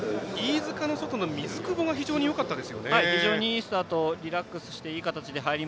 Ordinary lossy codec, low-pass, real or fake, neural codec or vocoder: none; none; real; none